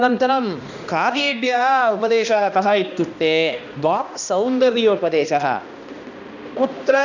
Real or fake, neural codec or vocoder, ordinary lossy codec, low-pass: fake; codec, 16 kHz, 1 kbps, X-Codec, HuBERT features, trained on balanced general audio; none; 7.2 kHz